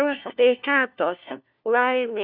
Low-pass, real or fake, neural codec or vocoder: 5.4 kHz; fake; codec, 16 kHz, 1 kbps, FunCodec, trained on LibriTTS, 50 frames a second